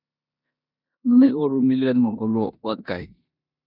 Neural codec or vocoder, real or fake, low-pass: codec, 16 kHz in and 24 kHz out, 0.9 kbps, LongCat-Audio-Codec, four codebook decoder; fake; 5.4 kHz